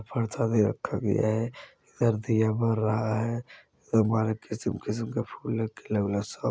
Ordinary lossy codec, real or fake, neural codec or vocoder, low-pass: none; real; none; none